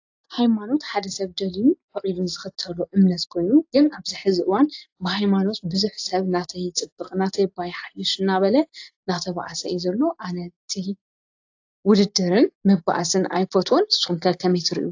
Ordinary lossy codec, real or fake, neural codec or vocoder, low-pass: AAC, 48 kbps; real; none; 7.2 kHz